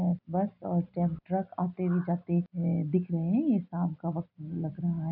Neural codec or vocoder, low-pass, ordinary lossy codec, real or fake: none; 5.4 kHz; none; real